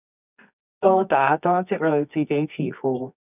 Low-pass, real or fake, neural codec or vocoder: 3.6 kHz; fake; codec, 24 kHz, 0.9 kbps, WavTokenizer, medium music audio release